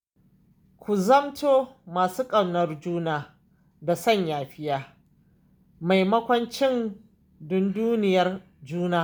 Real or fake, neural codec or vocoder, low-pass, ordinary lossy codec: real; none; none; none